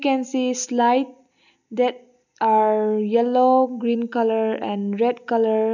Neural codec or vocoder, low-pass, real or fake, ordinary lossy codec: none; 7.2 kHz; real; none